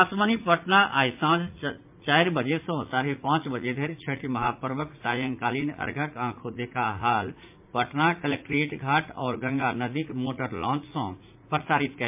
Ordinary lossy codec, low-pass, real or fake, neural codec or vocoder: MP3, 32 kbps; 3.6 kHz; fake; vocoder, 44.1 kHz, 80 mel bands, Vocos